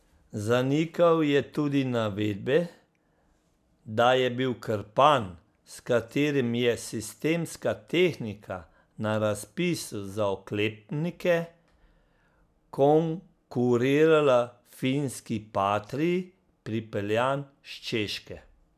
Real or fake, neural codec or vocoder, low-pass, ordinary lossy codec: real; none; 14.4 kHz; none